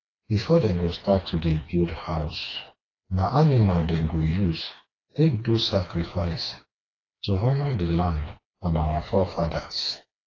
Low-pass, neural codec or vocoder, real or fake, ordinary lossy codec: 7.2 kHz; codec, 16 kHz, 2 kbps, FreqCodec, smaller model; fake; AAC, 32 kbps